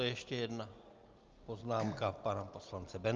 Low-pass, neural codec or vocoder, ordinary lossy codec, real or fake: 7.2 kHz; none; Opus, 24 kbps; real